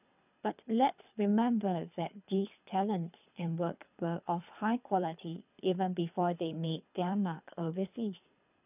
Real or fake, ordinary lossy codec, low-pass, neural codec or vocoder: fake; none; 3.6 kHz; codec, 24 kHz, 3 kbps, HILCodec